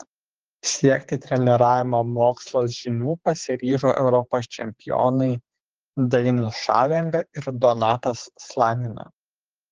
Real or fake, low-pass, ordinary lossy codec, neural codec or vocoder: fake; 7.2 kHz; Opus, 16 kbps; codec, 16 kHz, 4 kbps, X-Codec, HuBERT features, trained on general audio